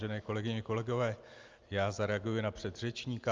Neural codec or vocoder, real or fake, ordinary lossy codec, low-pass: none; real; Opus, 24 kbps; 7.2 kHz